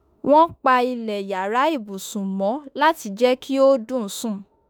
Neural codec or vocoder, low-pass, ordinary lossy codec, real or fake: autoencoder, 48 kHz, 32 numbers a frame, DAC-VAE, trained on Japanese speech; none; none; fake